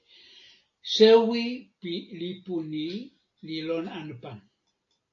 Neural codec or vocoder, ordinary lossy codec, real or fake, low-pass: none; AAC, 48 kbps; real; 7.2 kHz